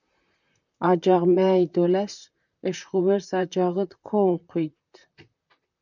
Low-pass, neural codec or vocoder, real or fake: 7.2 kHz; vocoder, 44.1 kHz, 128 mel bands, Pupu-Vocoder; fake